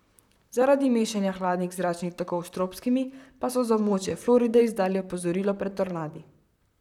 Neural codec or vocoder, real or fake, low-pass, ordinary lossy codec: vocoder, 44.1 kHz, 128 mel bands, Pupu-Vocoder; fake; 19.8 kHz; none